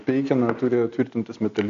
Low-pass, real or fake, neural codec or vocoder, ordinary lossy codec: 7.2 kHz; real; none; MP3, 48 kbps